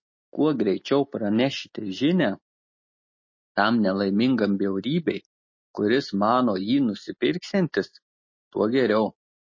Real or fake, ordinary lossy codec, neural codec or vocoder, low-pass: real; MP3, 32 kbps; none; 7.2 kHz